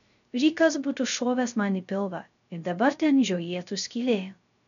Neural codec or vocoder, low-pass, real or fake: codec, 16 kHz, 0.3 kbps, FocalCodec; 7.2 kHz; fake